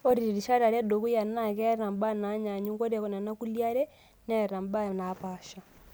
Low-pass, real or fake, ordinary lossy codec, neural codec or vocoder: none; real; none; none